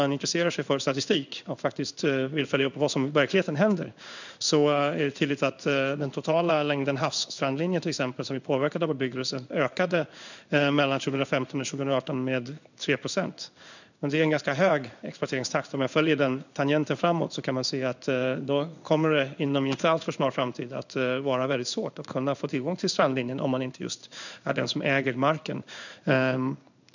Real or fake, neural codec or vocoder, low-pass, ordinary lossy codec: fake; codec, 16 kHz in and 24 kHz out, 1 kbps, XY-Tokenizer; 7.2 kHz; none